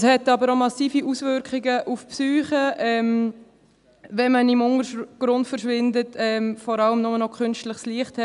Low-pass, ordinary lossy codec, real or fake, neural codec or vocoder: 10.8 kHz; none; real; none